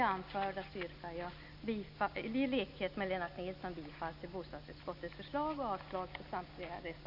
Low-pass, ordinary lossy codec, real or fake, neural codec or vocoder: 5.4 kHz; AAC, 48 kbps; real; none